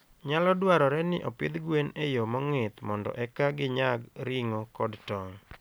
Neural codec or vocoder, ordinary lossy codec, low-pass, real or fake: none; none; none; real